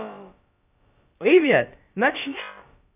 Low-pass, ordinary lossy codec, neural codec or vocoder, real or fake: 3.6 kHz; none; codec, 16 kHz, about 1 kbps, DyCAST, with the encoder's durations; fake